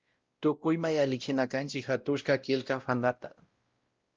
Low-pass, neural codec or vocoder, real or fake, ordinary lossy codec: 7.2 kHz; codec, 16 kHz, 0.5 kbps, X-Codec, WavLM features, trained on Multilingual LibriSpeech; fake; Opus, 24 kbps